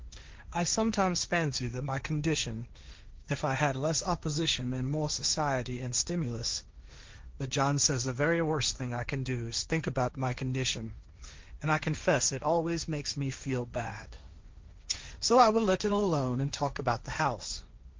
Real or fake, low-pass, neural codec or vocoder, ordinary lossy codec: fake; 7.2 kHz; codec, 16 kHz, 1.1 kbps, Voila-Tokenizer; Opus, 32 kbps